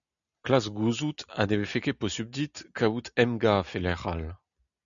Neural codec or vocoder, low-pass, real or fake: none; 7.2 kHz; real